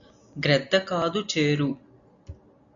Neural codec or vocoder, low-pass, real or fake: none; 7.2 kHz; real